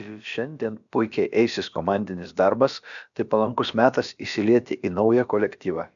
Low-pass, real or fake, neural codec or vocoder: 7.2 kHz; fake; codec, 16 kHz, about 1 kbps, DyCAST, with the encoder's durations